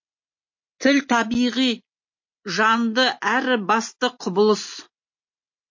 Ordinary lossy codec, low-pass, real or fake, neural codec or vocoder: MP3, 32 kbps; 7.2 kHz; real; none